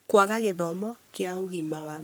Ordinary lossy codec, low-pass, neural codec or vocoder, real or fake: none; none; codec, 44.1 kHz, 3.4 kbps, Pupu-Codec; fake